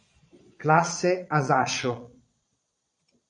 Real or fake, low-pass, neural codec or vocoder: fake; 9.9 kHz; vocoder, 22.05 kHz, 80 mel bands, Vocos